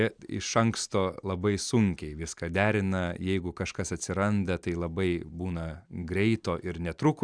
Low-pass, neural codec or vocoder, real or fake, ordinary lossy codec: 9.9 kHz; none; real; Opus, 64 kbps